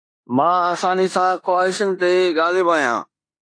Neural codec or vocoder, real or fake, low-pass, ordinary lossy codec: codec, 16 kHz in and 24 kHz out, 0.9 kbps, LongCat-Audio-Codec, four codebook decoder; fake; 9.9 kHz; AAC, 48 kbps